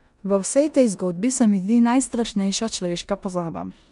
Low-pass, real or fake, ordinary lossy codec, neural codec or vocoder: 10.8 kHz; fake; none; codec, 16 kHz in and 24 kHz out, 0.9 kbps, LongCat-Audio-Codec, four codebook decoder